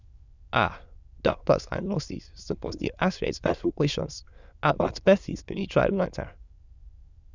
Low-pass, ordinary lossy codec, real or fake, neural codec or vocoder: 7.2 kHz; Opus, 64 kbps; fake; autoencoder, 22.05 kHz, a latent of 192 numbers a frame, VITS, trained on many speakers